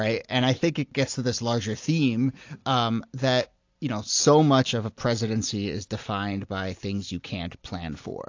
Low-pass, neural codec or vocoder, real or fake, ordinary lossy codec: 7.2 kHz; none; real; AAC, 48 kbps